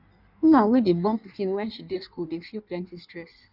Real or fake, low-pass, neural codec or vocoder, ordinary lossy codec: fake; 5.4 kHz; codec, 16 kHz in and 24 kHz out, 1.1 kbps, FireRedTTS-2 codec; none